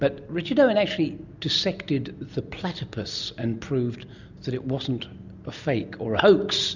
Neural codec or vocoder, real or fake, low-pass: none; real; 7.2 kHz